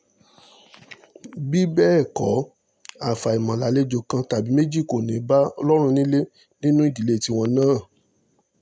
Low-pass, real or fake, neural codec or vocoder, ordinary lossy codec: none; real; none; none